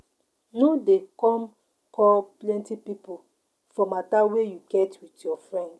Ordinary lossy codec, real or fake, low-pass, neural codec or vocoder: none; real; none; none